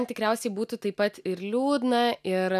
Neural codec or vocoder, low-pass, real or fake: none; 14.4 kHz; real